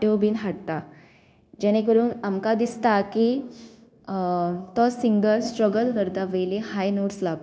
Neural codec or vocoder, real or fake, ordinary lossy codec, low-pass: codec, 16 kHz, 0.9 kbps, LongCat-Audio-Codec; fake; none; none